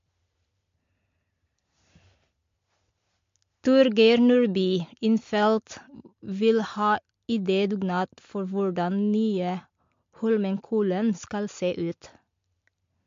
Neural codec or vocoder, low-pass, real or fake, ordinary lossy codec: none; 7.2 kHz; real; MP3, 48 kbps